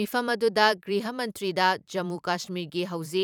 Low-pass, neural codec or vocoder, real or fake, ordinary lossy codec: 19.8 kHz; vocoder, 44.1 kHz, 128 mel bands every 512 samples, BigVGAN v2; fake; none